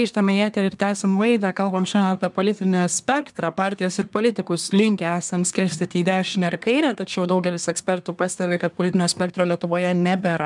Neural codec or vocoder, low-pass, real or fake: codec, 24 kHz, 1 kbps, SNAC; 10.8 kHz; fake